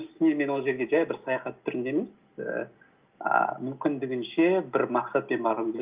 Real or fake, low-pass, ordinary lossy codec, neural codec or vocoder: real; 3.6 kHz; Opus, 32 kbps; none